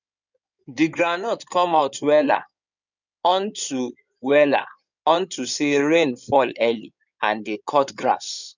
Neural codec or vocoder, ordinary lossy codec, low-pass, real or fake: codec, 16 kHz in and 24 kHz out, 2.2 kbps, FireRedTTS-2 codec; none; 7.2 kHz; fake